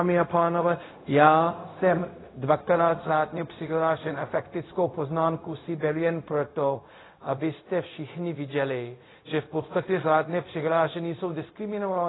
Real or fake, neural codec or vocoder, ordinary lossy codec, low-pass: fake; codec, 16 kHz, 0.4 kbps, LongCat-Audio-Codec; AAC, 16 kbps; 7.2 kHz